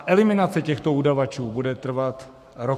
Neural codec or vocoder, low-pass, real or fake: codec, 44.1 kHz, 7.8 kbps, Pupu-Codec; 14.4 kHz; fake